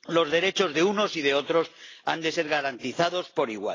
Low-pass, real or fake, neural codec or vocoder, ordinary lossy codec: 7.2 kHz; real; none; AAC, 32 kbps